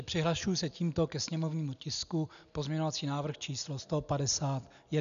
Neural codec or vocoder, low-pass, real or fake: none; 7.2 kHz; real